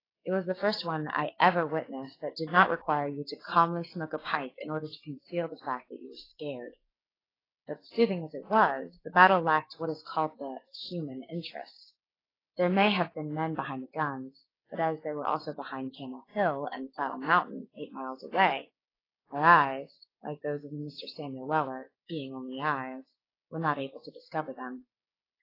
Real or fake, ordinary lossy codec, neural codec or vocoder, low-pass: fake; AAC, 24 kbps; codec, 16 kHz, 6 kbps, DAC; 5.4 kHz